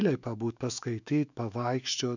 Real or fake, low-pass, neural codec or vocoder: fake; 7.2 kHz; autoencoder, 48 kHz, 128 numbers a frame, DAC-VAE, trained on Japanese speech